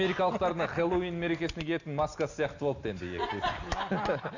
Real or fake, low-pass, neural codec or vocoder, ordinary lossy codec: real; 7.2 kHz; none; none